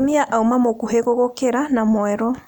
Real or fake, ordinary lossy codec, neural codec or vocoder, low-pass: real; none; none; 19.8 kHz